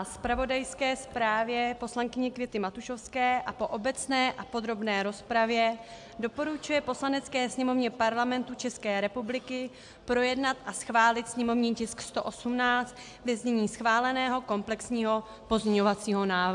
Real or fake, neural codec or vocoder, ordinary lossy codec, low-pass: real; none; AAC, 64 kbps; 10.8 kHz